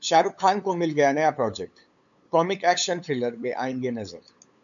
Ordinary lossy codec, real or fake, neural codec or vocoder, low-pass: MP3, 96 kbps; fake; codec, 16 kHz, 8 kbps, FunCodec, trained on LibriTTS, 25 frames a second; 7.2 kHz